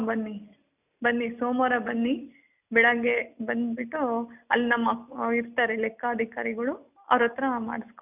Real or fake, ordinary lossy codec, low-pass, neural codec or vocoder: real; none; 3.6 kHz; none